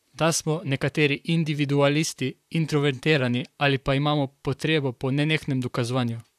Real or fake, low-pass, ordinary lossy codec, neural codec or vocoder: fake; 14.4 kHz; none; vocoder, 44.1 kHz, 128 mel bands, Pupu-Vocoder